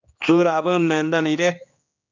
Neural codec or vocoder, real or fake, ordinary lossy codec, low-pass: codec, 16 kHz, 2 kbps, X-Codec, HuBERT features, trained on general audio; fake; MP3, 64 kbps; 7.2 kHz